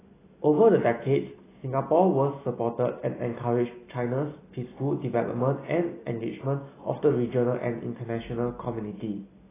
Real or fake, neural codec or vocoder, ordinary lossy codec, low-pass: real; none; AAC, 16 kbps; 3.6 kHz